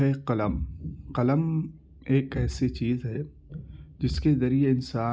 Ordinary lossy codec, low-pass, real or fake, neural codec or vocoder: none; none; real; none